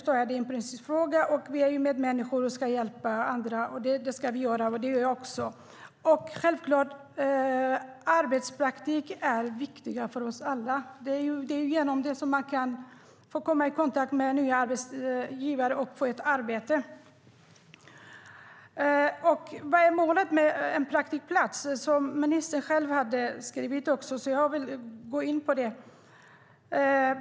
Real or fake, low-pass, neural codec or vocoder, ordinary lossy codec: real; none; none; none